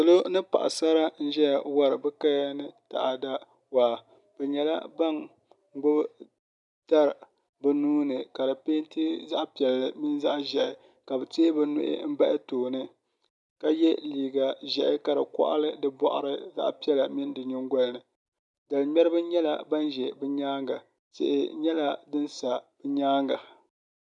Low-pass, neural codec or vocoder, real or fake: 10.8 kHz; none; real